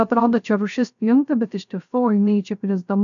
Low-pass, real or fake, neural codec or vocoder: 7.2 kHz; fake; codec, 16 kHz, 0.3 kbps, FocalCodec